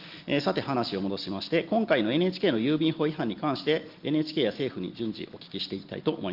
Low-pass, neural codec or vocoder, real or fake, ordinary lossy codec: 5.4 kHz; none; real; Opus, 32 kbps